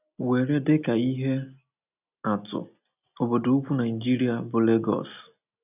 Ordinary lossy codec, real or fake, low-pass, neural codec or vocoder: none; real; 3.6 kHz; none